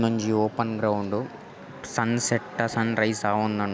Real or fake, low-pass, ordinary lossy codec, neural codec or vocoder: real; none; none; none